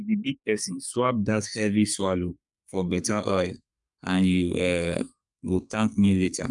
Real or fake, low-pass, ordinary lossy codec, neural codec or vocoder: fake; 10.8 kHz; none; codec, 32 kHz, 1.9 kbps, SNAC